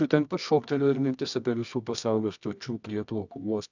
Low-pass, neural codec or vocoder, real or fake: 7.2 kHz; codec, 24 kHz, 0.9 kbps, WavTokenizer, medium music audio release; fake